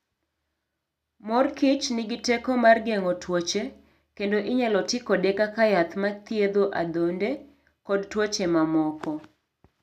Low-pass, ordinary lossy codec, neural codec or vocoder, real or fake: 14.4 kHz; none; none; real